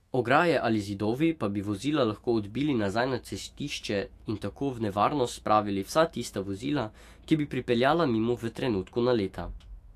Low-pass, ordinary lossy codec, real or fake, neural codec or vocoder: 14.4 kHz; AAC, 64 kbps; fake; autoencoder, 48 kHz, 128 numbers a frame, DAC-VAE, trained on Japanese speech